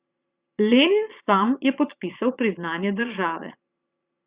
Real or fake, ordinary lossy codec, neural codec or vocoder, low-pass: fake; Opus, 64 kbps; vocoder, 22.05 kHz, 80 mel bands, Vocos; 3.6 kHz